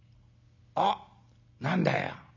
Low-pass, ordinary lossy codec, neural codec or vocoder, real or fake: 7.2 kHz; none; none; real